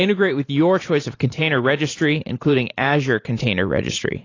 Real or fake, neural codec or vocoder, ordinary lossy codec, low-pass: fake; vocoder, 44.1 kHz, 128 mel bands every 512 samples, BigVGAN v2; AAC, 32 kbps; 7.2 kHz